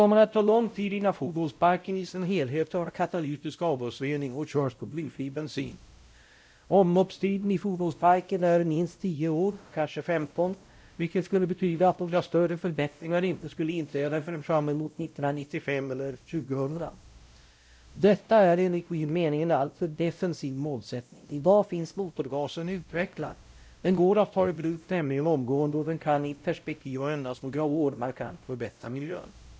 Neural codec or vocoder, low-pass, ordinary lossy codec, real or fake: codec, 16 kHz, 0.5 kbps, X-Codec, WavLM features, trained on Multilingual LibriSpeech; none; none; fake